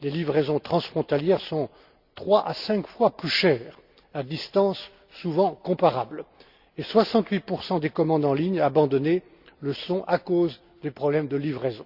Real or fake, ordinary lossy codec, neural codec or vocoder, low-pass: real; Opus, 64 kbps; none; 5.4 kHz